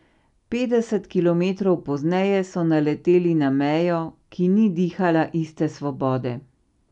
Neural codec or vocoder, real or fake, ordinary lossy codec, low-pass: none; real; none; 10.8 kHz